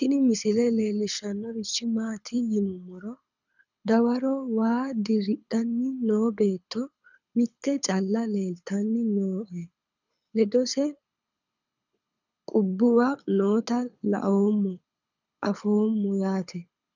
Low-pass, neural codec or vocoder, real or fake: 7.2 kHz; codec, 24 kHz, 6 kbps, HILCodec; fake